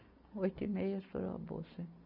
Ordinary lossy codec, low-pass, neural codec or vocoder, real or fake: none; 5.4 kHz; none; real